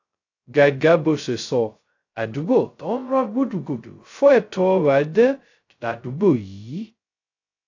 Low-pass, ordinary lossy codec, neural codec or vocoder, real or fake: 7.2 kHz; AAC, 48 kbps; codec, 16 kHz, 0.2 kbps, FocalCodec; fake